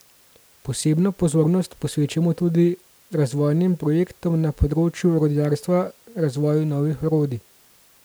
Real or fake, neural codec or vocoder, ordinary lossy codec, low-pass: real; none; none; none